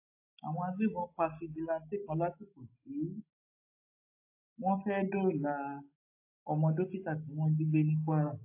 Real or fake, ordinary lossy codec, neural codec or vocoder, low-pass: real; AAC, 32 kbps; none; 3.6 kHz